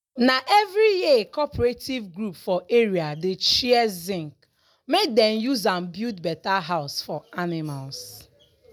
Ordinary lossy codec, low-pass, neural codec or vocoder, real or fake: none; none; none; real